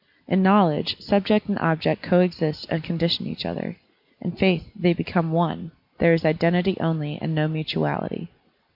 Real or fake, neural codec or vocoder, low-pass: real; none; 5.4 kHz